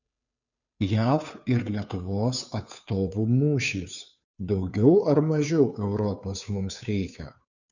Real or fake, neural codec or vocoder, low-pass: fake; codec, 16 kHz, 2 kbps, FunCodec, trained on Chinese and English, 25 frames a second; 7.2 kHz